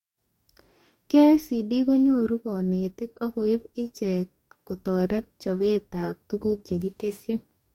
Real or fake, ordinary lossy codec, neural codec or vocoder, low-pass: fake; MP3, 64 kbps; codec, 44.1 kHz, 2.6 kbps, DAC; 19.8 kHz